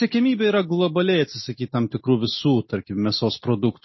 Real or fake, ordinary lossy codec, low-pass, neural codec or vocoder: real; MP3, 24 kbps; 7.2 kHz; none